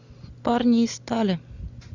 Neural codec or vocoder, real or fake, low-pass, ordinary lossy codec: none; real; 7.2 kHz; Opus, 64 kbps